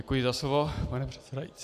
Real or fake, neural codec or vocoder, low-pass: real; none; 14.4 kHz